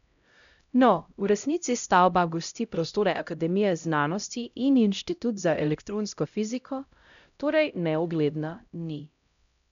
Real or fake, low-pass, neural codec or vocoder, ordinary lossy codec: fake; 7.2 kHz; codec, 16 kHz, 0.5 kbps, X-Codec, HuBERT features, trained on LibriSpeech; none